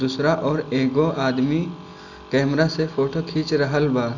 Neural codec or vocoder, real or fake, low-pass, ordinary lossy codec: none; real; 7.2 kHz; none